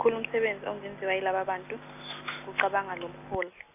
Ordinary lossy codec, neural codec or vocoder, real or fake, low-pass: AAC, 32 kbps; none; real; 3.6 kHz